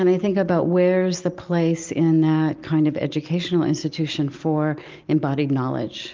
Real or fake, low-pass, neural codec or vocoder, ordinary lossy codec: real; 7.2 kHz; none; Opus, 24 kbps